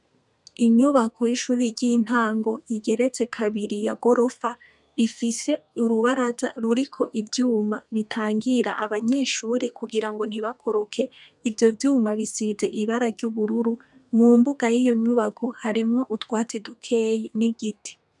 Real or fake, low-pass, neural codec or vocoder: fake; 10.8 kHz; codec, 32 kHz, 1.9 kbps, SNAC